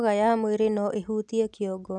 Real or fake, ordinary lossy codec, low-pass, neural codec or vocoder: real; none; 9.9 kHz; none